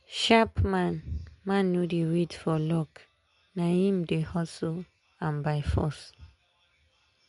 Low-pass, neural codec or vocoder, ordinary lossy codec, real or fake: 10.8 kHz; none; MP3, 64 kbps; real